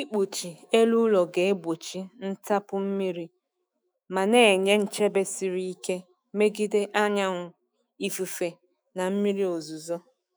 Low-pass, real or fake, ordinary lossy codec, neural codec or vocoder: none; fake; none; autoencoder, 48 kHz, 128 numbers a frame, DAC-VAE, trained on Japanese speech